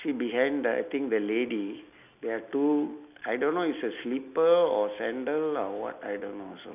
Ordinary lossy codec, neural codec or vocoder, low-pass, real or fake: none; none; 3.6 kHz; real